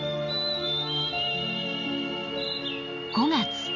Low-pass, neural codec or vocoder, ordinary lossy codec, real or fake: 7.2 kHz; none; none; real